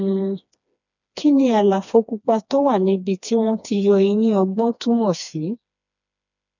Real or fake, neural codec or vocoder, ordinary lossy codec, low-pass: fake; codec, 16 kHz, 2 kbps, FreqCodec, smaller model; none; 7.2 kHz